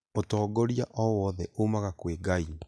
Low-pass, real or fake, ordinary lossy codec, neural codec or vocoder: none; real; none; none